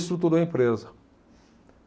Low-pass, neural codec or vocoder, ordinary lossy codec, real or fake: none; none; none; real